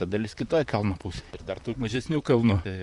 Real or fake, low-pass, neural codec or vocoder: fake; 10.8 kHz; vocoder, 44.1 kHz, 128 mel bands, Pupu-Vocoder